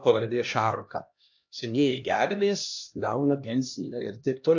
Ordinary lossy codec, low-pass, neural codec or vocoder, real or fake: AAC, 48 kbps; 7.2 kHz; codec, 16 kHz, 1 kbps, X-Codec, HuBERT features, trained on LibriSpeech; fake